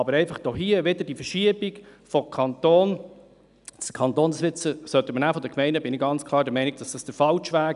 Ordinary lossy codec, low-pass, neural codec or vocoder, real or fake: none; 10.8 kHz; none; real